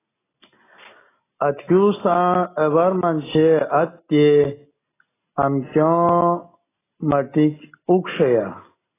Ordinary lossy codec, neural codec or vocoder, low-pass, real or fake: AAC, 16 kbps; none; 3.6 kHz; real